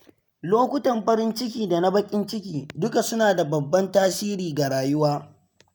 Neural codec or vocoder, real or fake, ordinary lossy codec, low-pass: none; real; none; none